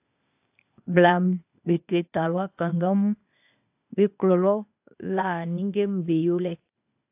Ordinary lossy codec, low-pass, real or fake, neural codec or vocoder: AAC, 32 kbps; 3.6 kHz; fake; codec, 16 kHz, 0.8 kbps, ZipCodec